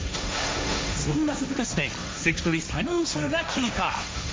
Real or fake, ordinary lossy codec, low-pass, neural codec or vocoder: fake; none; none; codec, 16 kHz, 1.1 kbps, Voila-Tokenizer